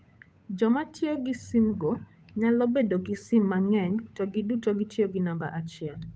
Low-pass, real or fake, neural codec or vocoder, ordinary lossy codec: none; fake; codec, 16 kHz, 8 kbps, FunCodec, trained on Chinese and English, 25 frames a second; none